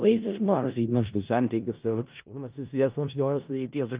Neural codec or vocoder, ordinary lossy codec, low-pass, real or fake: codec, 16 kHz in and 24 kHz out, 0.4 kbps, LongCat-Audio-Codec, four codebook decoder; Opus, 24 kbps; 3.6 kHz; fake